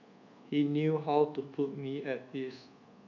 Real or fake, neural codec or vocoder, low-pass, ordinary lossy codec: fake; codec, 24 kHz, 1.2 kbps, DualCodec; 7.2 kHz; none